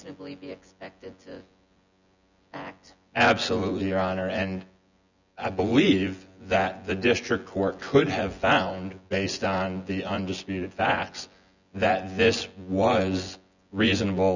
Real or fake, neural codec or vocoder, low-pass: fake; vocoder, 24 kHz, 100 mel bands, Vocos; 7.2 kHz